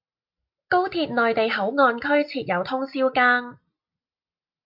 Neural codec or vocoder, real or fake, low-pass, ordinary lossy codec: none; real; 5.4 kHz; MP3, 48 kbps